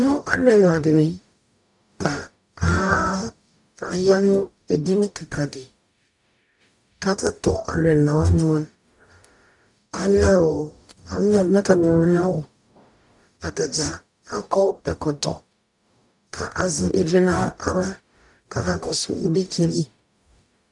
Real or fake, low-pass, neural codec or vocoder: fake; 10.8 kHz; codec, 44.1 kHz, 0.9 kbps, DAC